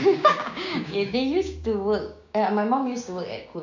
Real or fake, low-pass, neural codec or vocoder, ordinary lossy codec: fake; 7.2 kHz; codec, 16 kHz, 6 kbps, DAC; none